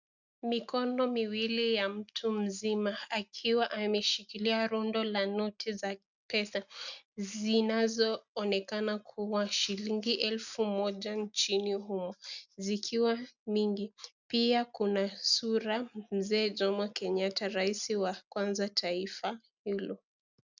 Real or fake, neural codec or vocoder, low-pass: real; none; 7.2 kHz